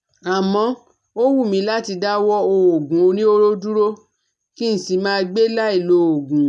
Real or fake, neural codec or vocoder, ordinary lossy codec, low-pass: real; none; none; none